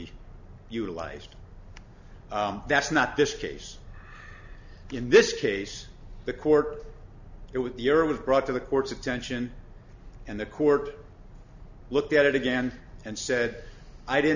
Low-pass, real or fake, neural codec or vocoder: 7.2 kHz; real; none